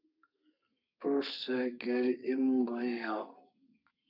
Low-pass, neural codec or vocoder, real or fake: 5.4 kHz; codec, 32 kHz, 1.9 kbps, SNAC; fake